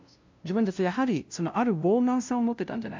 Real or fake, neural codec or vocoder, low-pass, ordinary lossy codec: fake; codec, 16 kHz, 0.5 kbps, FunCodec, trained on LibriTTS, 25 frames a second; 7.2 kHz; MP3, 64 kbps